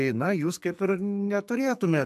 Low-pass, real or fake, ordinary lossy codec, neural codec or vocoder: 14.4 kHz; fake; AAC, 96 kbps; codec, 44.1 kHz, 2.6 kbps, SNAC